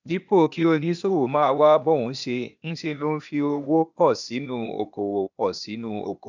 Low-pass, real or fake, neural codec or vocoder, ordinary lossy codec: 7.2 kHz; fake; codec, 16 kHz, 0.8 kbps, ZipCodec; none